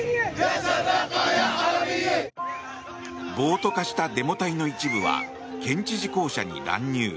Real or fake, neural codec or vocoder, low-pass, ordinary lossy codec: real; none; none; none